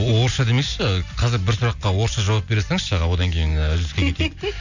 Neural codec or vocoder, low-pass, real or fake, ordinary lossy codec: none; 7.2 kHz; real; none